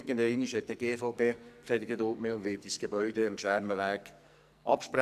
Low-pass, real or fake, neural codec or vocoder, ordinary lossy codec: 14.4 kHz; fake; codec, 44.1 kHz, 2.6 kbps, SNAC; none